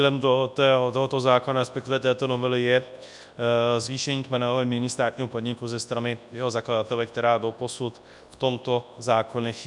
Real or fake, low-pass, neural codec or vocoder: fake; 10.8 kHz; codec, 24 kHz, 0.9 kbps, WavTokenizer, large speech release